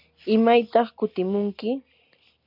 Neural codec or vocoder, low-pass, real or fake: none; 5.4 kHz; real